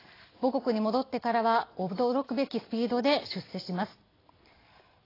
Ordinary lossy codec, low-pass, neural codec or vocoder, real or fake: AAC, 24 kbps; 5.4 kHz; none; real